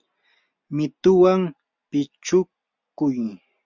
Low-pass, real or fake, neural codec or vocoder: 7.2 kHz; real; none